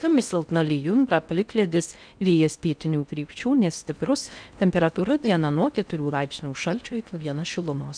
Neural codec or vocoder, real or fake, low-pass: codec, 16 kHz in and 24 kHz out, 0.6 kbps, FocalCodec, streaming, 4096 codes; fake; 9.9 kHz